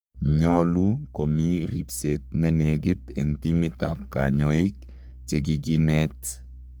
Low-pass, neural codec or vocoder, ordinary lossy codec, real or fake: none; codec, 44.1 kHz, 3.4 kbps, Pupu-Codec; none; fake